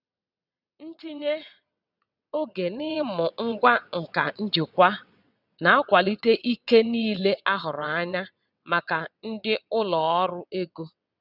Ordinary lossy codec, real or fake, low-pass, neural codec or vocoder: none; fake; 5.4 kHz; vocoder, 22.05 kHz, 80 mel bands, WaveNeXt